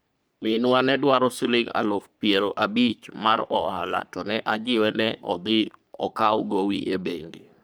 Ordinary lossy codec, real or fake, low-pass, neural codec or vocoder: none; fake; none; codec, 44.1 kHz, 3.4 kbps, Pupu-Codec